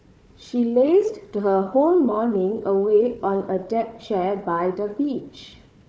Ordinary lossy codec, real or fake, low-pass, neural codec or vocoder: none; fake; none; codec, 16 kHz, 4 kbps, FunCodec, trained on Chinese and English, 50 frames a second